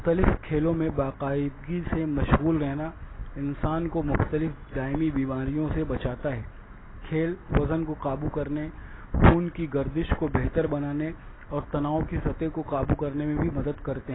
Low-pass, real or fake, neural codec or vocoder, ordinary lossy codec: 7.2 kHz; real; none; AAC, 16 kbps